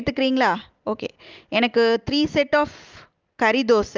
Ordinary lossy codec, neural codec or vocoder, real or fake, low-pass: Opus, 24 kbps; none; real; 7.2 kHz